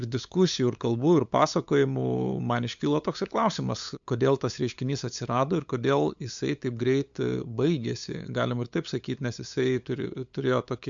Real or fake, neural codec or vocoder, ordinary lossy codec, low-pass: fake; codec, 16 kHz, 8 kbps, FunCodec, trained on LibriTTS, 25 frames a second; MP3, 64 kbps; 7.2 kHz